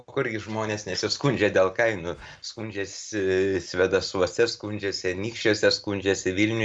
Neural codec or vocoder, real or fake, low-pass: none; real; 9.9 kHz